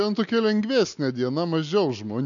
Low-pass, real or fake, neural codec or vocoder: 7.2 kHz; real; none